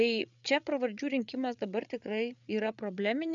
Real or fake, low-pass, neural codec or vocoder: fake; 7.2 kHz; codec, 16 kHz, 8 kbps, FreqCodec, larger model